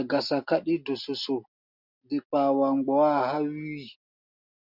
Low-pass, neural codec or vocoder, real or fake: 5.4 kHz; none; real